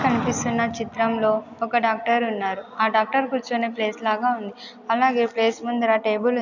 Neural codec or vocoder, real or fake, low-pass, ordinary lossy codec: none; real; 7.2 kHz; none